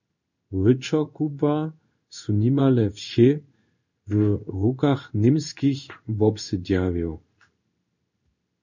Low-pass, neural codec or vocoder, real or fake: 7.2 kHz; codec, 16 kHz in and 24 kHz out, 1 kbps, XY-Tokenizer; fake